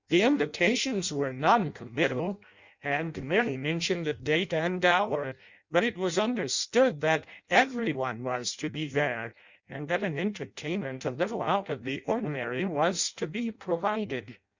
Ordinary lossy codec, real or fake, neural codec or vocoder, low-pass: Opus, 64 kbps; fake; codec, 16 kHz in and 24 kHz out, 0.6 kbps, FireRedTTS-2 codec; 7.2 kHz